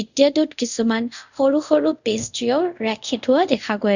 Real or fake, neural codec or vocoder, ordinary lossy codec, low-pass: fake; codec, 24 kHz, 0.5 kbps, DualCodec; none; 7.2 kHz